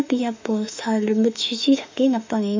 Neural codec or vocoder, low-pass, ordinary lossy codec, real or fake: autoencoder, 48 kHz, 32 numbers a frame, DAC-VAE, trained on Japanese speech; 7.2 kHz; MP3, 64 kbps; fake